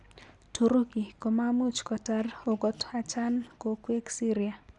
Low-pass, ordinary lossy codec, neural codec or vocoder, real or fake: 10.8 kHz; Opus, 64 kbps; none; real